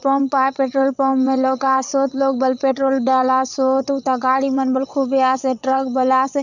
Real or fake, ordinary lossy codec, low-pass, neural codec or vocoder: fake; none; 7.2 kHz; vocoder, 22.05 kHz, 80 mel bands, WaveNeXt